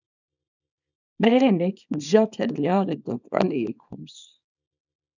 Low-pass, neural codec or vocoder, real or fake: 7.2 kHz; codec, 24 kHz, 0.9 kbps, WavTokenizer, small release; fake